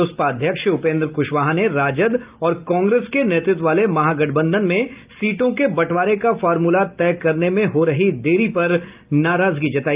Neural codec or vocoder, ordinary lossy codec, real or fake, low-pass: none; Opus, 24 kbps; real; 3.6 kHz